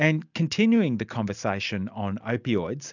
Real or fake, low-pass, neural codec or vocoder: real; 7.2 kHz; none